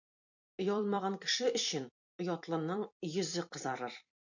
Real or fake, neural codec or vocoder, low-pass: fake; vocoder, 44.1 kHz, 128 mel bands every 256 samples, BigVGAN v2; 7.2 kHz